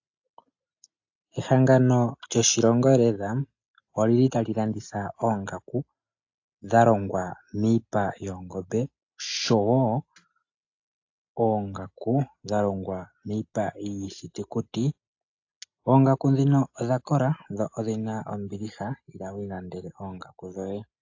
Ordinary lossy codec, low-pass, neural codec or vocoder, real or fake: AAC, 48 kbps; 7.2 kHz; none; real